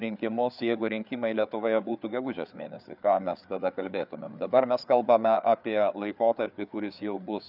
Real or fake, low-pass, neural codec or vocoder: fake; 5.4 kHz; codec, 16 kHz, 4 kbps, FreqCodec, larger model